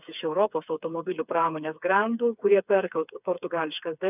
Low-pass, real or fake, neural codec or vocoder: 3.6 kHz; fake; codec, 16 kHz, 4 kbps, FreqCodec, smaller model